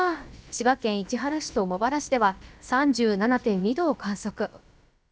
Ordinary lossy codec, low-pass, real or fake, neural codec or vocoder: none; none; fake; codec, 16 kHz, about 1 kbps, DyCAST, with the encoder's durations